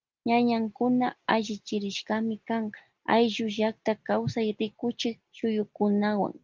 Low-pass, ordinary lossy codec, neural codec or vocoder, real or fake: 7.2 kHz; Opus, 32 kbps; none; real